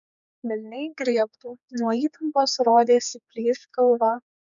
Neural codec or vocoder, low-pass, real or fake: codec, 16 kHz, 4 kbps, X-Codec, HuBERT features, trained on general audio; 7.2 kHz; fake